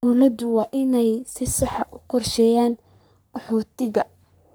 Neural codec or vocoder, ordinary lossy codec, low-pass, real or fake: codec, 44.1 kHz, 3.4 kbps, Pupu-Codec; none; none; fake